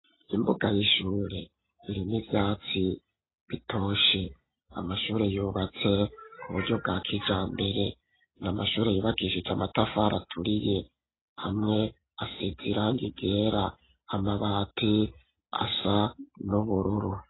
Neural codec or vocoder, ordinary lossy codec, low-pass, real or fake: none; AAC, 16 kbps; 7.2 kHz; real